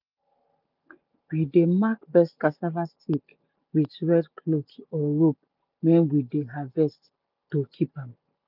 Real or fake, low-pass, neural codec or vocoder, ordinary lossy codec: real; 5.4 kHz; none; none